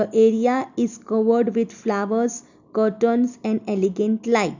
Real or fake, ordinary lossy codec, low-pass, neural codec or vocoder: real; none; 7.2 kHz; none